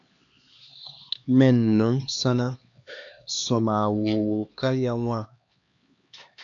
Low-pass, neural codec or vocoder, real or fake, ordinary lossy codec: 7.2 kHz; codec, 16 kHz, 2 kbps, X-Codec, HuBERT features, trained on LibriSpeech; fake; AAC, 64 kbps